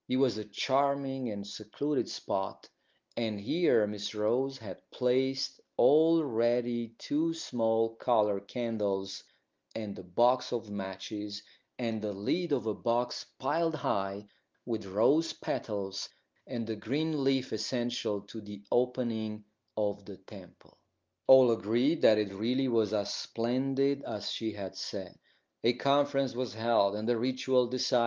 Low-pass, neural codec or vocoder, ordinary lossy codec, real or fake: 7.2 kHz; none; Opus, 32 kbps; real